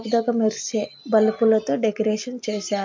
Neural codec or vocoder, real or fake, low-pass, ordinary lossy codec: none; real; 7.2 kHz; MP3, 48 kbps